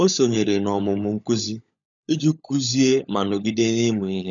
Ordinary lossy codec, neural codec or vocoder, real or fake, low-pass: none; codec, 16 kHz, 16 kbps, FunCodec, trained on LibriTTS, 50 frames a second; fake; 7.2 kHz